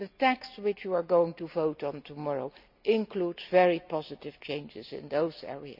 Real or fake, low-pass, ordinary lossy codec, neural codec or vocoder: real; 5.4 kHz; none; none